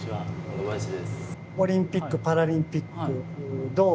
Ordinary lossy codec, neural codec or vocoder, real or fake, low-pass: none; none; real; none